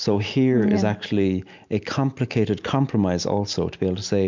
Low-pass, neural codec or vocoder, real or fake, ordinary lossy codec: 7.2 kHz; none; real; MP3, 64 kbps